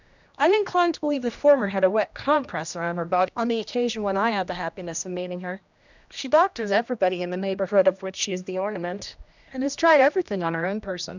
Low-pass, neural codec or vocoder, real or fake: 7.2 kHz; codec, 16 kHz, 1 kbps, X-Codec, HuBERT features, trained on general audio; fake